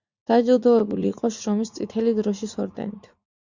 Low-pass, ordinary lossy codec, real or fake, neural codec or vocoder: 7.2 kHz; Opus, 64 kbps; fake; vocoder, 44.1 kHz, 80 mel bands, Vocos